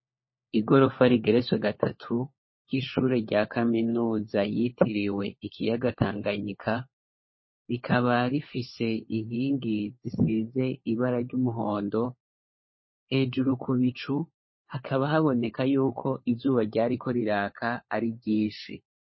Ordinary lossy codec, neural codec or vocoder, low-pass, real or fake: MP3, 24 kbps; codec, 16 kHz, 4 kbps, FunCodec, trained on LibriTTS, 50 frames a second; 7.2 kHz; fake